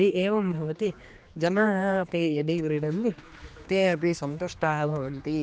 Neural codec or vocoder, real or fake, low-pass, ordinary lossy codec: codec, 16 kHz, 2 kbps, X-Codec, HuBERT features, trained on general audio; fake; none; none